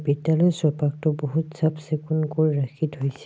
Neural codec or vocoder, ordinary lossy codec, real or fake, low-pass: none; none; real; none